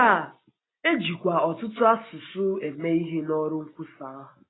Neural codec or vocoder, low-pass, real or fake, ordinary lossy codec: none; 7.2 kHz; real; AAC, 16 kbps